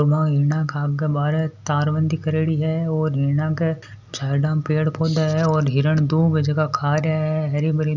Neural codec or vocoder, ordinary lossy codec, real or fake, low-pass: none; none; real; 7.2 kHz